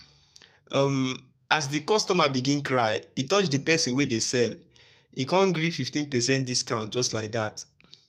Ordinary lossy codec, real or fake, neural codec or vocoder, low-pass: none; fake; codec, 32 kHz, 1.9 kbps, SNAC; 14.4 kHz